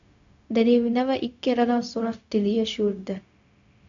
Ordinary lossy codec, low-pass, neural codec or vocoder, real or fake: AAC, 64 kbps; 7.2 kHz; codec, 16 kHz, 0.4 kbps, LongCat-Audio-Codec; fake